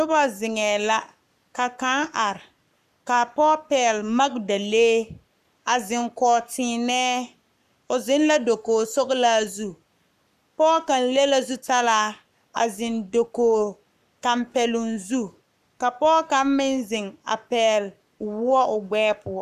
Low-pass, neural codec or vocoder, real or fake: 14.4 kHz; codec, 44.1 kHz, 7.8 kbps, Pupu-Codec; fake